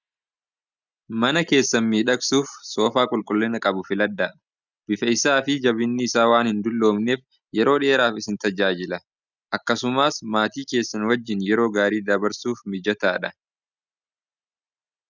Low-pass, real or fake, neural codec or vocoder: 7.2 kHz; real; none